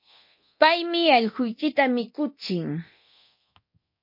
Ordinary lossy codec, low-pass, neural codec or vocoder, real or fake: MP3, 32 kbps; 5.4 kHz; codec, 24 kHz, 0.9 kbps, DualCodec; fake